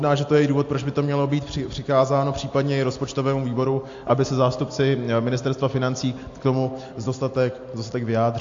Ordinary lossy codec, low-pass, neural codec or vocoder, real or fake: AAC, 48 kbps; 7.2 kHz; none; real